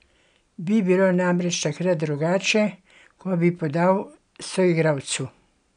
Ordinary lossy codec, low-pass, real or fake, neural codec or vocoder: none; 9.9 kHz; real; none